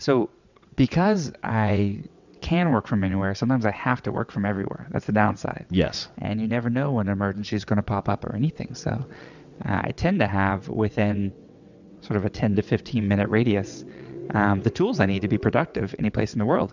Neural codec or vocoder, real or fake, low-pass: vocoder, 22.05 kHz, 80 mel bands, WaveNeXt; fake; 7.2 kHz